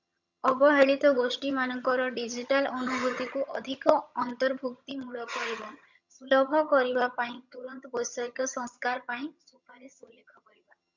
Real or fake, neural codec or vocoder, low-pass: fake; vocoder, 22.05 kHz, 80 mel bands, HiFi-GAN; 7.2 kHz